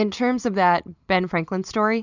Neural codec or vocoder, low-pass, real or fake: none; 7.2 kHz; real